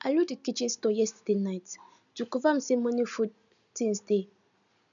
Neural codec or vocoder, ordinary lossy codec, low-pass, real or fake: none; none; 7.2 kHz; real